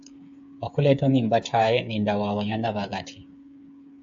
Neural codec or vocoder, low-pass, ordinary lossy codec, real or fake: codec, 16 kHz, 8 kbps, FreqCodec, smaller model; 7.2 kHz; AAC, 64 kbps; fake